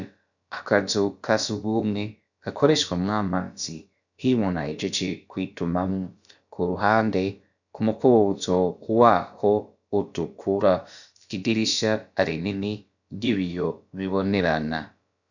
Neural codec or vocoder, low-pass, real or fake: codec, 16 kHz, about 1 kbps, DyCAST, with the encoder's durations; 7.2 kHz; fake